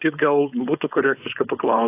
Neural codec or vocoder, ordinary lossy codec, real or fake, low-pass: codec, 16 kHz, 4.8 kbps, FACodec; AAC, 24 kbps; fake; 3.6 kHz